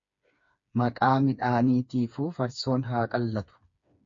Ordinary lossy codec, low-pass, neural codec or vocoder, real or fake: MP3, 48 kbps; 7.2 kHz; codec, 16 kHz, 4 kbps, FreqCodec, smaller model; fake